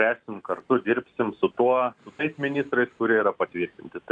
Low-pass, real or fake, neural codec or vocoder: 9.9 kHz; real; none